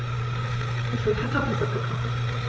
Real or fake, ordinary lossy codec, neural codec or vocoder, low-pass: fake; none; codec, 16 kHz, 8 kbps, FreqCodec, larger model; none